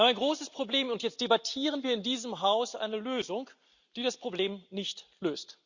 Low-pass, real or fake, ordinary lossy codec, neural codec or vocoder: 7.2 kHz; real; Opus, 64 kbps; none